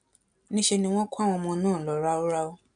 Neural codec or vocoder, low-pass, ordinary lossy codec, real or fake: none; 9.9 kHz; none; real